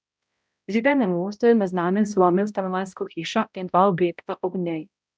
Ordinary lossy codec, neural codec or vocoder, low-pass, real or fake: none; codec, 16 kHz, 0.5 kbps, X-Codec, HuBERT features, trained on balanced general audio; none; fake